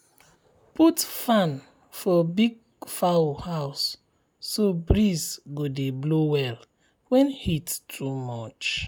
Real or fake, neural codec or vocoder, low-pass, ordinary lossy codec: real; none; none; none